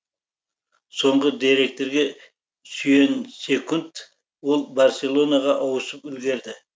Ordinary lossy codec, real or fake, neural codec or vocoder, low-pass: none; real; none; none